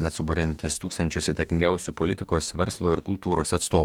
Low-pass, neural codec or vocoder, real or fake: 19.8 kHz; codec, 44.1 kHz, 2.6 kbps, DAC; fake